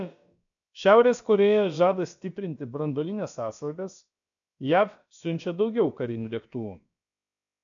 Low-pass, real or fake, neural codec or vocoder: 7.2 kHz; fake; codec, 16 kHz, about 1 kbps, DyCAST, with the encoder's durations